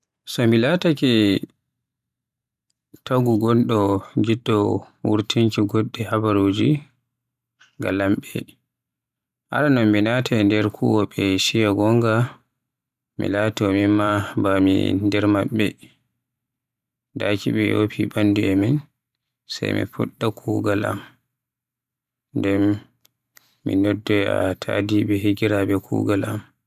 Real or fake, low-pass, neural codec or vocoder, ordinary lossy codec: fake; 14.4 kHz; vocoder, 44.1 kHz, 128 mel bands every 512 samples, BigVGAN v2; none